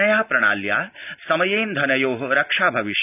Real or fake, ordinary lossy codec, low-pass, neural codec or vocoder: real; none; 3.6 kHz; none